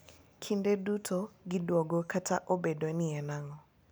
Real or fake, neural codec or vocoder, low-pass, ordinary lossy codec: real; none; none; none